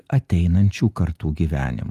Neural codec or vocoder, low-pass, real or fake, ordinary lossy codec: none; 14.4 kHz; real; Opus, 32 kbps